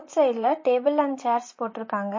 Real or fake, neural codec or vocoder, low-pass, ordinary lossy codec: fake; vocoder, 44.1 kHz, 128 mel bands, Pupu-Vocoder; 7.2 kHz; MP3, 32 kbps